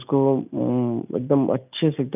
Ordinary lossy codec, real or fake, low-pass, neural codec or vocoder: none; real; 3.6 kHz; none